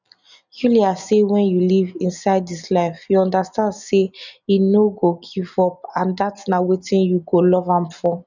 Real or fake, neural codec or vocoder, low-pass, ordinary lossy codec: real; none; 7.2 kHz; none